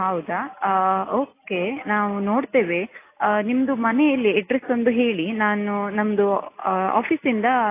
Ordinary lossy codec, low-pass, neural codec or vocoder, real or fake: AAC, 24 kbps; 3.6 kHz; none; real